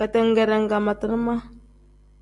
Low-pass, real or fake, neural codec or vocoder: 10.8 kHz; real; none